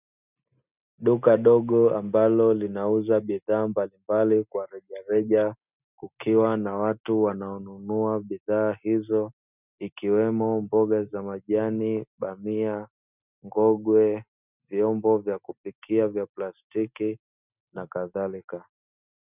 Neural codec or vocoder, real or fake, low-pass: none; real; 3.6 kHz